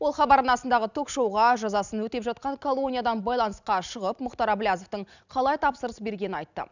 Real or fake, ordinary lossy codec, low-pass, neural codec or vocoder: real; none; 7.2 kHz; none